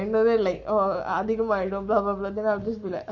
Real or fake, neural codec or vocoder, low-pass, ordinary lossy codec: fake; codec, 44.1 kHz, 7.8 kbps, Pupu-Codec; 7.2 kHz; none